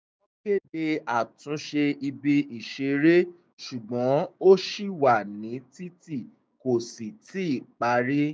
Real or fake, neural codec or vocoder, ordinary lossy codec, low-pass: fake; codec, 16 kHz, 6 kbps, DAC; none; none